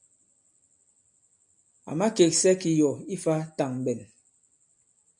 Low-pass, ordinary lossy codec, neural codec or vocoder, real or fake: 10.8 kHz; MP3, 64 kbps; none; real